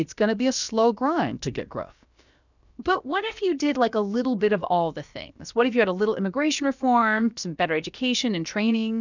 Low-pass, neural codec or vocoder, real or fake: 7.2 kHz; codec, 16 kHz, about 1 kbps, DyCAST, with the encoder's durations; fake